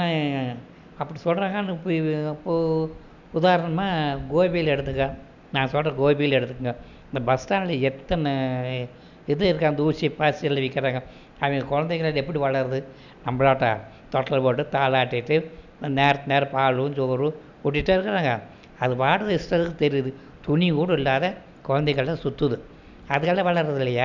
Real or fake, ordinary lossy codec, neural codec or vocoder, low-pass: real; none; none; 7.2 kHz